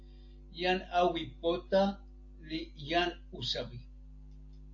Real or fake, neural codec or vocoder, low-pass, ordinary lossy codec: real; none; 7.2 kHz; AAC, 48 kbps